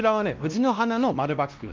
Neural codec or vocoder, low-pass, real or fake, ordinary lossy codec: codec, 16 kHz, 1 kbps, X-Codec, WavLM features, trained on Multilingual LibriSpeech; 7.2 kHz; fake; Opus, 24 kbps